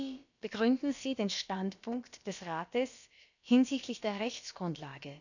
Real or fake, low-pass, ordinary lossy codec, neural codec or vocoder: fake; 7.2 kHz; none; codec, 16 kHz, about 1 kbps, DyCAST, with the encoder's durations